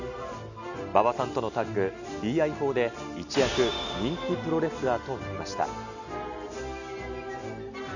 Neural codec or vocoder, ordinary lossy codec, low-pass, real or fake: none; none; 7.2 kHz; real